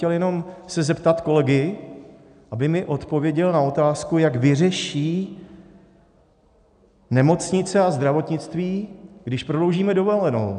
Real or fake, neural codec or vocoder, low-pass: real; none; 9.9 kHz